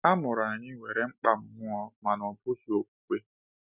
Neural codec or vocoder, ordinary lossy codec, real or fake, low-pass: none; none; real; 3.6 kHz